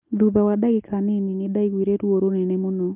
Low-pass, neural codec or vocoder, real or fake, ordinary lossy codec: 3.6 kHz; none; real; Opus, 24 kbps